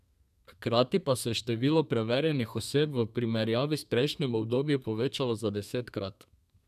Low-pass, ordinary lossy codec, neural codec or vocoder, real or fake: 14.4 kHz; none; codec, 32 kHz, 1.9 kbps, SNAC; fake